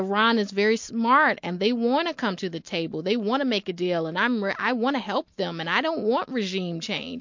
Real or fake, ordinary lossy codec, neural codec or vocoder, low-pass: real; MP3, 48 kbps; none; 7.2 kHz